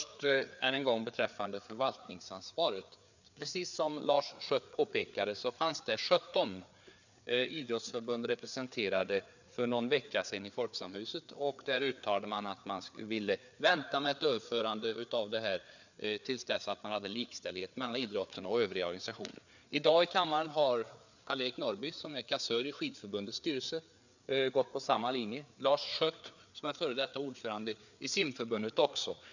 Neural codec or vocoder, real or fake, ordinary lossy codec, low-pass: codec, 16 kHz, 4 kbps, FreqCodec, larger model; fake; none; 7.2 kHz